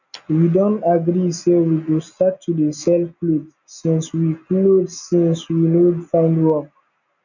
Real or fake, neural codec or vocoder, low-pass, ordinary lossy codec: real; none; 7.2 kHz; none